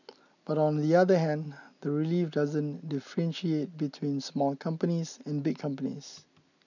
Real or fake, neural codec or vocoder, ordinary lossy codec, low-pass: real; none; none; 7.2 kHz